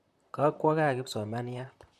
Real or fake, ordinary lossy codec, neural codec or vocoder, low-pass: fake; MP3, 64 kbps; vocoder, 44.1 kHz, 128 mel bands, Pupu-Vocoder; 14.4 kHz